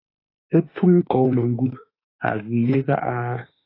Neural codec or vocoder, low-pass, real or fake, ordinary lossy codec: autoencoder, 48 kHz, 32 numbers a frame, DAC-VAE, trained on Japanese speech; 5.4 kHz; fake; AAC, 24 kbps